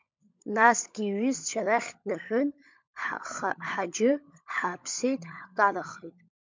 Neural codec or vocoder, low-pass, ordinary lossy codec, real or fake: codec, 16 kHz, 16 kbps, FunCodec, trained on LibriTTS, 50 frames a second; 7.2 kHz; MP3, 64 kbps; fake